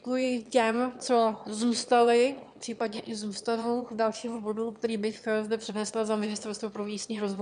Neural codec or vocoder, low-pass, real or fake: autoencoder, 22.05 kHz, a latent of 192 numbers a frame, VITS, trained on one speaker; 9.9 kHz; fake